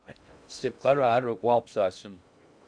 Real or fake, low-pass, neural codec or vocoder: fake; 9.9 kHz; codec, 16 kHz in and 24 kHz out, 0.6 kbps, FocalCodec, streaming, 2048 codes